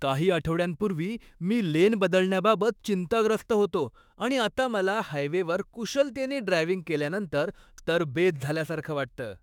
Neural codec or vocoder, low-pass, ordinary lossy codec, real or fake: autoencoder, 48 kHz, 32 numbers a frame, DAC-VAE, trained on Japanese speech; 19.8 kHz; none; fake